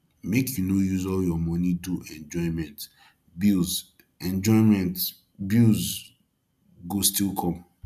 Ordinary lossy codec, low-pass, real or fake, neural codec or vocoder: none; 14.4 kHz; real; none